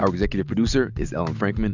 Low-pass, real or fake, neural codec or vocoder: 7.2 kHz; real; none